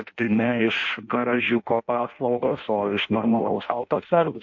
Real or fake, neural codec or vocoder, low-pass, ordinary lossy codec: fake; codec, 16 kHz in and 24 kHz out, 0.6 kbps, FireRedTTS-2 codec; 7.2 kHz; MP3, 48 kbps